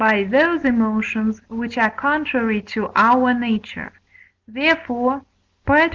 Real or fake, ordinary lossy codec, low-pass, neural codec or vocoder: real; Opus, 24 kbps; 7.2 kHz; none